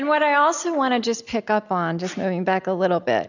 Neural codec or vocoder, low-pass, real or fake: none; 7.2 kHz; real